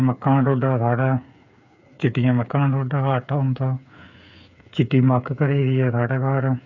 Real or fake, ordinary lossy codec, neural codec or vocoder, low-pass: fake; none; codec, 16 kHz, 8 kbps, FreqCodec, smaller model; 7.2 kHz